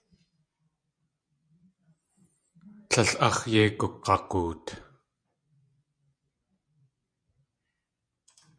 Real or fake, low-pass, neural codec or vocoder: fake; 9.9 kHz; vocoder, 24 kHz, 100 mel bands, Vocos